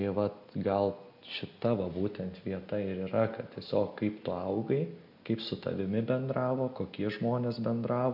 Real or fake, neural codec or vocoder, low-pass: real; none; 5.4 kHz